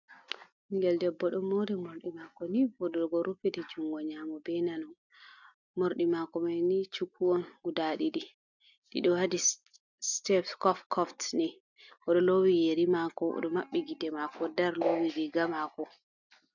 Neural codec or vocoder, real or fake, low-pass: none; real; 7.2 kHz